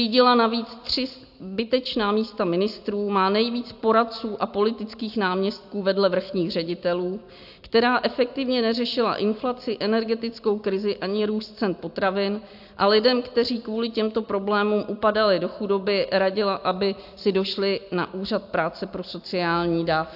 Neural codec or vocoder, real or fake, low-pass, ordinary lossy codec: none; real; 5.4 kHz; AAC, 48 kbps